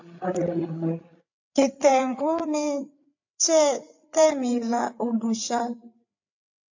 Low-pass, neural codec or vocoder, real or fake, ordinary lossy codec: 7.2 kHz; codec, 16 kHz, 8 kbps, FreqCodec, larger model; fake; AAC, 48 kbps